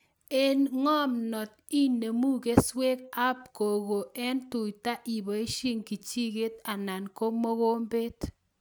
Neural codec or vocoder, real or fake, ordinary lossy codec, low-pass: none; real; none; none